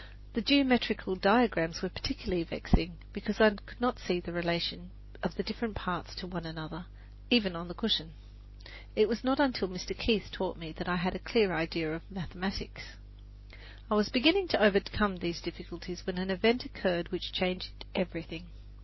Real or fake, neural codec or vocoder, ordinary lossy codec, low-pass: real; none; MP3, 24 kbps; 7.2 kHz